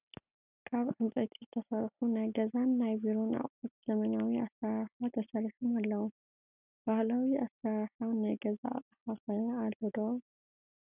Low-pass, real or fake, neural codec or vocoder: 3.6 kHz; real; none